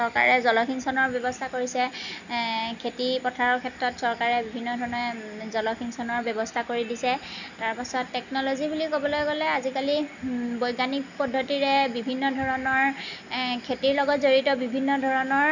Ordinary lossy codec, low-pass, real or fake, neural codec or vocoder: none; 7.2 kHz; real; none